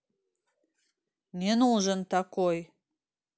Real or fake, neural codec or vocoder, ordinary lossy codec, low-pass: real; none; none; none